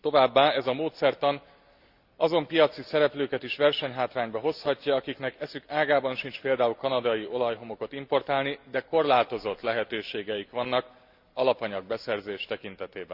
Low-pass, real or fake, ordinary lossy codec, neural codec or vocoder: 5.4 kHz; real; Opus, 64 kbps; none